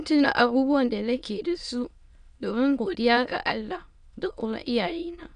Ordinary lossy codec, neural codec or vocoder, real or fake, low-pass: none; autoencoder, 22.05 kHz, a latent of 192 numbers a frame, VITS, trained on many speakers; fake; 9.9 kHz